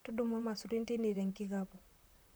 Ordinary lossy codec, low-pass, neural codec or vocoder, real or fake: none; none; vocoder, 44.1 kHz, 128 mel bands, Pupu-Vocoder; fake